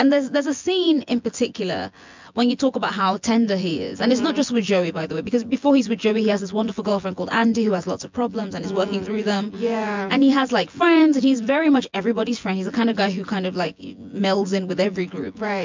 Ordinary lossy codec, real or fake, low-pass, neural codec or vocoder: MP3, 64 kbps; fake; 7.2 kHz; vocoder, 24 kHz, 100 mel bands, Vocos